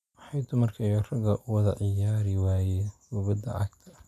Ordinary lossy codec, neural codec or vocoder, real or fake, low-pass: none; none; real; 14.4 kHz